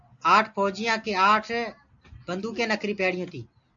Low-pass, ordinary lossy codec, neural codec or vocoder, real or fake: 7.2 kHz; AAC, 64 kbps; none; real